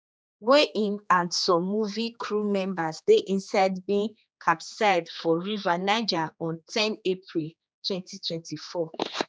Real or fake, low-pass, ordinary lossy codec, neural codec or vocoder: fake; none; none; codec, 16 kHz, 2 kbps, X-Codec, HuBERT features, trained on general audio